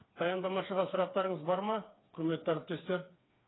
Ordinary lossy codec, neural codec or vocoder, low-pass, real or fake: AAC, 16 kbps; codec, 16 kHz, 4 kbps, FreqCodec, smaller model; 7.2 kHz; fake